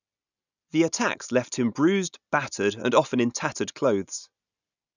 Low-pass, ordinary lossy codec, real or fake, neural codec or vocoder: 7.2 kHz; none; real; none